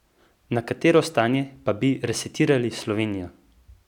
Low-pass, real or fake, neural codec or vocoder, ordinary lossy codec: 19.8 kHz; real; none; none